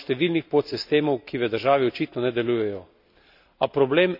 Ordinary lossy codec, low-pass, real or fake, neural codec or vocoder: none; 5.4 kHz; real; none